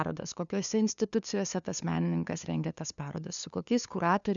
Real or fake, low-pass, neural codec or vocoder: fake; 7.2 kHz; codec, 16 kHz, 2 kbps, FunCodec, trained on LibriTTS, 25 frames a second